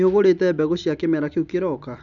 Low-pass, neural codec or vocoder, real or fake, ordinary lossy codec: 7.2 kHz; none; real; none